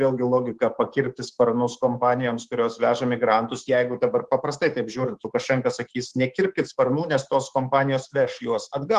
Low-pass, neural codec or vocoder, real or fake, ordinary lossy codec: 10.8 kHz; codec, 24 kHz, 3.1 kbps, DualCodec; fake; Opus, 16 kbps